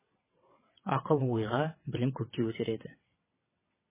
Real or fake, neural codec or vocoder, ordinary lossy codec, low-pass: fake; vocoder, 22.05 kHz, 80 mel bands, WaveNeXt; MP3, 16 kbps; 3.6 kHz